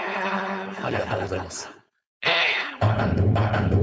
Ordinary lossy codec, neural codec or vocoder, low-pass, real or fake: none; codec, 16 kHz, 4.8 kbps, FACodec; none; fake